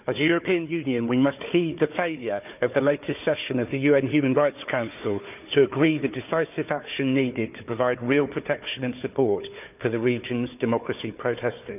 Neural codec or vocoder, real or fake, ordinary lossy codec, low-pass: codec, 16 kHz, 4 kbps, FunCodec, trained on Chinese and English, 50 frames a second; fake; none; 3.6 kHz